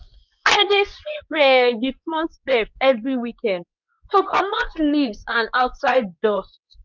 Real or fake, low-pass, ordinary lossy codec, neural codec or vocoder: fake; 7.2 kHz; none; codec, 24 kHz, 0.9 kbps, WavTokenizer, medium speech release version 2